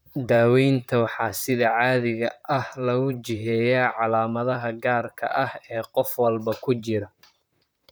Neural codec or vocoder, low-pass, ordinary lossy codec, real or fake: vocoder, 44.1 kHz, 128 mel bands, Pupu-Vocoder; none; none; fake